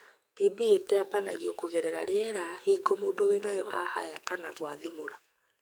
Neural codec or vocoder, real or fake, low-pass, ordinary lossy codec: codec, 44.1 kHz, 2.6 kbps, SNAC; fake; none; none